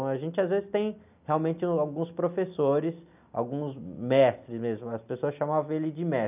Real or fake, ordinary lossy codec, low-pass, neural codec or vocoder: real; none; 3.6 kHz; none